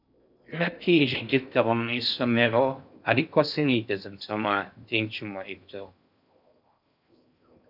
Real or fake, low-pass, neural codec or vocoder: fake; 5.4 kHz; codec, 16 kHz in and 24 kHz out, 0.6 kbps, FocalCodec, streaming, 4096 codes